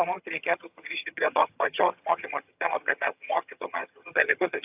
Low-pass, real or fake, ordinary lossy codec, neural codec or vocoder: 3.6 kHz; fake; AAC, 32 kbps; vocoder, 22.05 kHz, 80 mel bands, HiFi-GAN